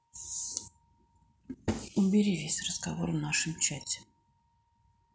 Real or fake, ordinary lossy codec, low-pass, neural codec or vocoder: real; none; none; none